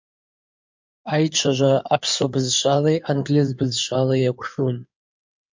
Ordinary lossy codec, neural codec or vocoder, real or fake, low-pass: MP3, 48 kbps; codec, 16 kHz in and 24 kHz out, 2.2 kbps, FireRedTTS-2 codec; fake; 7.2 kHz